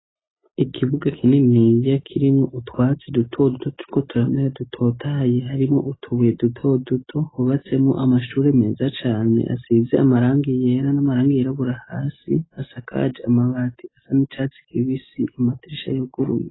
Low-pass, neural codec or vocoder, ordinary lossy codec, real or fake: 7.2 kHz; none; AAC, 16 kbps; real